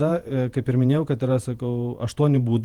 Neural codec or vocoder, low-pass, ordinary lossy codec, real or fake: vocoder, 48 kHz, 128 mel bands, Vocos; 19.8 kHz; Opus, 24 kbps; fake